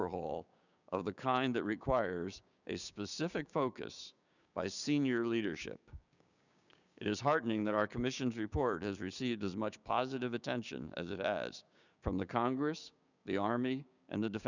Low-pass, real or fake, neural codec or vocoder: 7.2 kHz; fake; codec, 16 kHz, 6 kbps, DAC